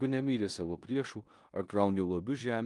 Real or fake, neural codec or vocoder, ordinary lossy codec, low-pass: fake; codec, 16 kHz in and 24 kHz out, 0.9 kbps, LongCat-Audio-Codec, four codebook decoder; Opus, 32 kbps; 10.8 kHz